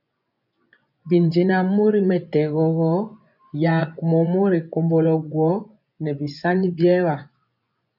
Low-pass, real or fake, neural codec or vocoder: 5.4 kHz; fake; vocoder, 24 kHz, 100 mel bands, Vocos